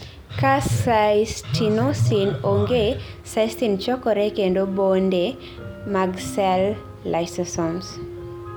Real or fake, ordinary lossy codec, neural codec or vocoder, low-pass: real; none; none; none